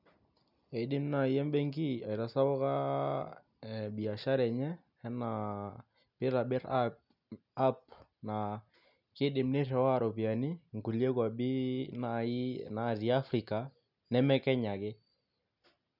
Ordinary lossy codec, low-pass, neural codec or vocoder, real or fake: none; 5.4 kHz; none; real